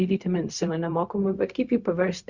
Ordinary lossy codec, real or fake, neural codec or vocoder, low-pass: Opus, 64 kbps; fake; codec, 16 kHz, 0.4 kbps, LongCat-Audio-Codec; 7.2 kHz